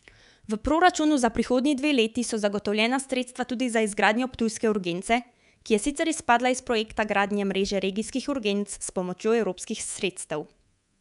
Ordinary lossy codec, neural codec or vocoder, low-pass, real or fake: none; codec, 24 kHz, 3.1 kbps, DualCodec; 10.8 kHz; fake